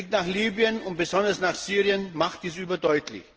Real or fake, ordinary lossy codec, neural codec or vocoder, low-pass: real; Opus, 16 kbps; none; 7.2 kHz